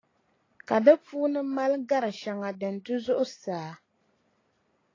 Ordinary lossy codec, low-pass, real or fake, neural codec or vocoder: AAC, 32 kbps; 7.2 kHz; real; none